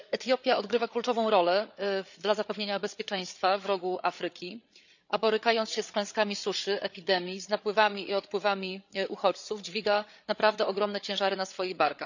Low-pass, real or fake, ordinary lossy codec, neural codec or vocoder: 7.2 kHz; fake; none; codec, 16 kHz, 8 kbps, FreqCodec, larger model